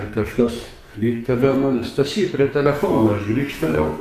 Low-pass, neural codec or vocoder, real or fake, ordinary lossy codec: 14.4 kHz; codec, 44.1 kHz, 2.6 kbps, SNAC; fake; AAC, 96 kbps